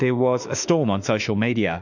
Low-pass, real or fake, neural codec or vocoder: 7.2 kHz; fake; autoencoder, 48 kHz, 32 numbers a frame, DAC-VAE, trained on Japanese speech